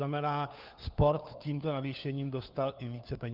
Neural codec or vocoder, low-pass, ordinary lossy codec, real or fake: codec, 16 kHz, 4 kbps, FunCodec, trained on LibriTTS, 50 frames a second; 5.4 kHz; Opus, 24 kbps; fake